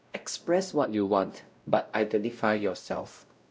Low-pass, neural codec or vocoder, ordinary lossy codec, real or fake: none; codec, 16 kHz, 0.5 kbps, X-Codec, WavLM features, trained on Multilingual LibriSpeech; none; fake